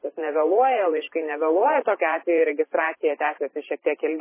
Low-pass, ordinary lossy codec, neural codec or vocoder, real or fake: 3.6 kHz; MP3, 16 kbps; vocoder, 44.1 kHz, 128 mel bands every 512 samples, BigVGAN v2; fake